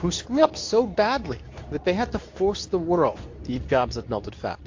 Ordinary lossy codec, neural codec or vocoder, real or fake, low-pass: AAC, 48 kbps; codec, 24 kHz, 0.9 kbps, WavTokenizer, medium speech release version 2; fake; 7.2 kHz